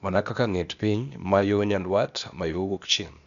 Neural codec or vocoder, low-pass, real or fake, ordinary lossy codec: codec, 16 kHz, 0.8 kbps, ZipCodec; 7.2 kHz; fake; none